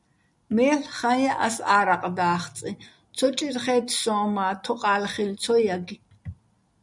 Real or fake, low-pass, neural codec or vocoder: real; 10.8 kHz; none